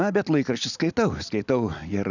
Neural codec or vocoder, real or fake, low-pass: none; real; 7.2 kHz